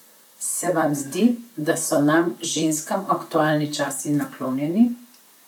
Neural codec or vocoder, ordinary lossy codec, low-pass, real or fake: vocoder, 44.1 kHz, 128 mel bands, Pupu-Vocoder; none; 19.8 kHz; fake